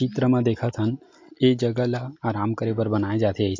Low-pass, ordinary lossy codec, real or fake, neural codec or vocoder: 7.2 kHz; MP3, 48 kbps; real; none